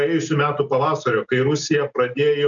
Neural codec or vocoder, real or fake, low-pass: none; real; 7.2 kHz